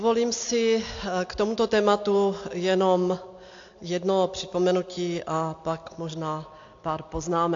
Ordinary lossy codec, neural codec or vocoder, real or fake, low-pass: AAC, 48 kbps; none; real; 7.2 kHz